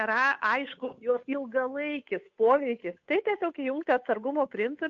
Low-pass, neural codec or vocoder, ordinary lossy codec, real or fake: 7.2 kHz; codec, 16 kHz, 8 kbps, FunCodec, trained on Chinese and English, 25 frames a second; MP3, 48 kbps; fake